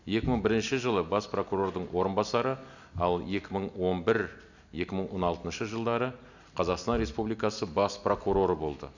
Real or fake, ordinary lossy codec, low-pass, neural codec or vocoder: real; none; 7.2 kHz; none